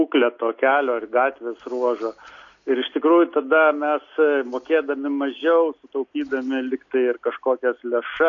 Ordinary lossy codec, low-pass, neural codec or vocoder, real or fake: AAC, 48 kbps; 7.2 kHz; none; real